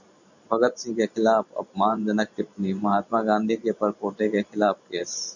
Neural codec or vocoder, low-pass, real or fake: vocoder, 44.1 kHz, 128 mel bands every 512 samples, BigVGAN v2; 7.2 kHz; fake